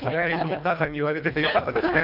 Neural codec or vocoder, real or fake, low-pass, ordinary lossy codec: codec, 24 kHz, 1.5 kbps, HILCodec; fake; 5.4 kHz; none